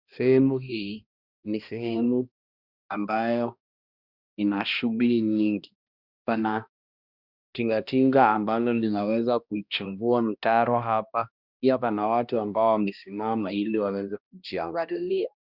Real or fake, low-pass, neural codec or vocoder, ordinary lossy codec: fake; 5.4 kHz; codec, 16 kHz, 1 kbps, X-Codec, HuBERT features, trained on balanced general audio; Opus, 64 kbps